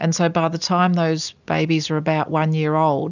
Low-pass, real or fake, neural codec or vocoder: 7.2 kHz; real; none